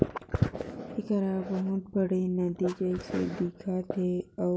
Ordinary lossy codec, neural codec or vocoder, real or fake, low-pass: none; none; real; none